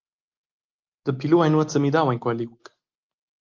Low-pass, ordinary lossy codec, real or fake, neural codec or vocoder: 7.2 kHz; Opus, 32 kbps; real; none